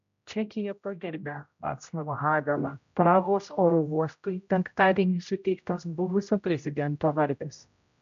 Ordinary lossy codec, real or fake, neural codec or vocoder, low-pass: MP3, 64 kbps; fake; codec, 16 kHz, 0.5 kbps, X-Codec, HuBERT features, trained on general audio; 7.2 kHz